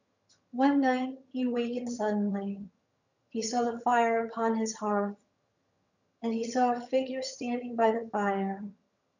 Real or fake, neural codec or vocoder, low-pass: fake; vocoder, 22.05 kHz, 80 mel bands, HiFi-GAN; 7.2 kHz